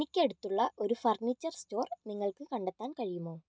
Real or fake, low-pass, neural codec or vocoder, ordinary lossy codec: real; none; none; none